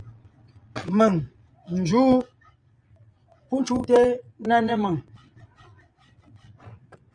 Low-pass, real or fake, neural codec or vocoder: 9.9 kHz; fake; vocoder, 22.05 kHz, 80 mel bands, Vocos